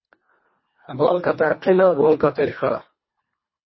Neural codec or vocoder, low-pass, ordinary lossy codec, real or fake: codec, 24 kHz, 1.5 kbps, HILCodec; 7.2 kHz; MP3, 24 kbps; fake